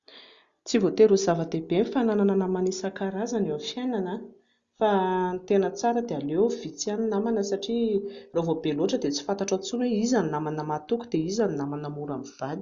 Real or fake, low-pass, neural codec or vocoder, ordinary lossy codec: real; 7.2 kHz; none; Opus, 64 kbps